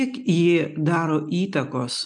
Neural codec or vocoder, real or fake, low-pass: none; real; 10.8 kHz